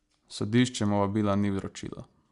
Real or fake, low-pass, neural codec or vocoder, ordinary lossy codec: real; 10.8 kHz; none; MP3, 96 kbps